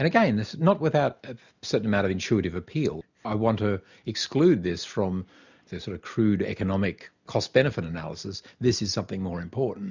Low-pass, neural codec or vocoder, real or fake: 7.2 kHz; none; real